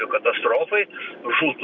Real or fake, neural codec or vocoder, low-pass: real; none; 7.2 kHz